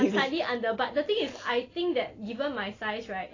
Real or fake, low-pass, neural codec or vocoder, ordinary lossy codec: real; 7.2 kHz; none; AAC, 32 kbps